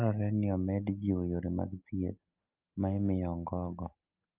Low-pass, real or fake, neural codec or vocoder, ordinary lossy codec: 3.6 kHz; real; none; Opus, 32 kbps